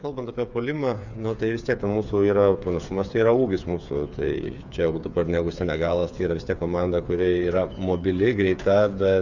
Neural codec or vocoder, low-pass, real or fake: codec, 16 kHz, 8 kbps, FreqCodec, smaller model; 7.2 kHz; fake